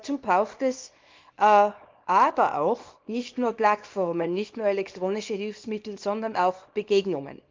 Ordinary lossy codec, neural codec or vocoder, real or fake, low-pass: Opus, 24 kbps; codec, 24 kHz, 0.9 kbps, WavTokenizer, small release; fake; 7.2 kHz